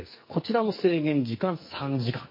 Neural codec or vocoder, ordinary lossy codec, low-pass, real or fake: codec, 44.1 kHz, 2.6 kbps, SNAC; MP3, 24 kbps; 5.4 kHz; fake